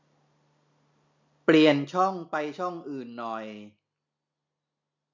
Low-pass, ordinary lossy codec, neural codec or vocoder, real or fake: 7.2 kHz; MP3, 64 kbps; none; real